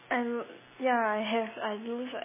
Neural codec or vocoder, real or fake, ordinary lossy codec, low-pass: none; real; MP3, 16 kbps; 3.6 kHz